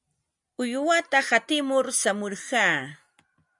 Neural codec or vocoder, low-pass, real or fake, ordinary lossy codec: none; 10.8 kHz; real; MP3, 96 kbps